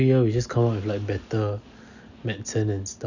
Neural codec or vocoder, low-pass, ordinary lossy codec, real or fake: none; 7.2 kHz; none; real